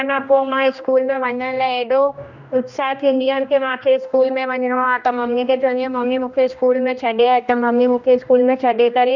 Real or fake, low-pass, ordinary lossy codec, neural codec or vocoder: fake; 7.2 kHz; none; codec, 16 kHz, 1 kbps, X-Codec, HuBERT features, trained on general audio